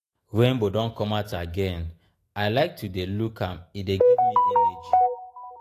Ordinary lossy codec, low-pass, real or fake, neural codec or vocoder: AAC, 48 kbps; 14.4 kHz; fake; autoencoder, 48 kHz, 128 numbers a frame, DAC-VAE, trained on Japanese speech